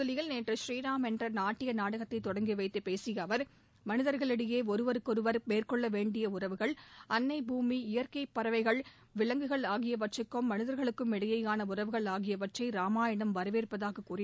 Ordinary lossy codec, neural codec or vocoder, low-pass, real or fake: none; none; none; real